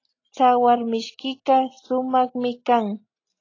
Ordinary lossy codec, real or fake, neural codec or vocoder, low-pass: AAC, 32 kbps; real; none; 7.2 kHz